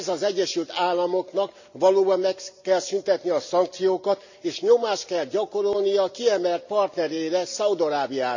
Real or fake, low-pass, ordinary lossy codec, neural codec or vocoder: real; 7.2 kHz; none; none